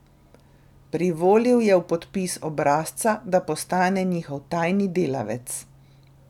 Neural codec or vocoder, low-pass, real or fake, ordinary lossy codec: none; 19.8 kHz; real; none